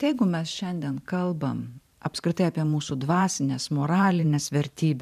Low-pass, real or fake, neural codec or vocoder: 14.4 kHz; fake; vocoder, 44.1 kHz, 128 mel bands every 512 samples, BigVGAN v2